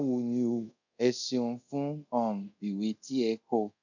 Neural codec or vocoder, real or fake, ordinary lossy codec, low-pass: codec, 24 kHz, 0.5 kbps, DualCodec; fake; none; 7.2 kHz